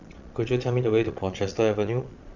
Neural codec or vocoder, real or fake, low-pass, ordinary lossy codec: vocoder, 22.05 kHz, 80 mel bands, Vocos; fake; 7.2 kHz; none